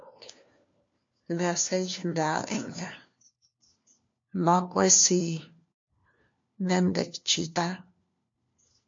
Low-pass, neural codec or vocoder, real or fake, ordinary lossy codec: 7.2 kHz; codec, 16 kHz, 1 kbps, FunCodec, trained on LibriTTS, 50 frames a second; fake; MP3, 48 kbps